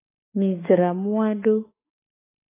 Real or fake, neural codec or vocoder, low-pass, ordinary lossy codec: fake; autoencoder, 48 kHz, 32 numbers a frame, DAC-VAE, trained on Japanese speech; 3.6 kHz; AAC, 16 kbps